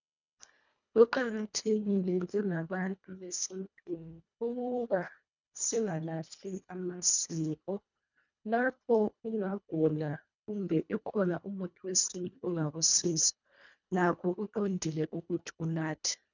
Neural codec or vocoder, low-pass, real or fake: codec, 24 kHz, 1.5 kbps, HILCodec; 7.2 kHz; fake